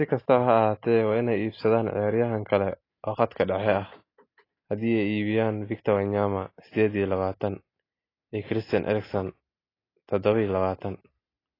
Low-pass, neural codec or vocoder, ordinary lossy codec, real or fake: 5.4 kHz; none; AAC, 24 kbps; real